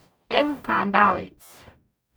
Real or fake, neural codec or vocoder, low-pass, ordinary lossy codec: fake; codec, 44.1 kHz, 0.9 kbps, DAC; none; none